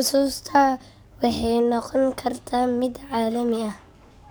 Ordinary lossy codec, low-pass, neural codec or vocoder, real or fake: none; none; codec, 44.1 kHz, 7.8 kbps, DAC; fake